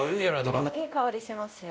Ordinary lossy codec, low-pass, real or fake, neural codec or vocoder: none; none; fake; codec, 16 kHz, 1 kbps, X-Codec, WavLM features, trained on Multilingual LibriSpeech